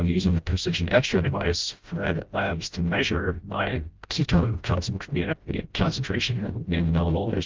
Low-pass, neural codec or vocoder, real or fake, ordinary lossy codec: 7.2 kHz; codec, 16 kHz, 0.5 kbps, FreqCodec, smaller model; fake; Opus, 32 kbps